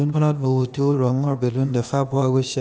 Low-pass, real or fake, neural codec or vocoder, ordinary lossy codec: none; fake; codec, 16 kHz, 0.8 kbps, ZipCodec; none